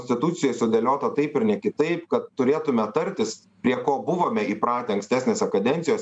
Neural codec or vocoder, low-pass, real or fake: none; 9.9 kHz; real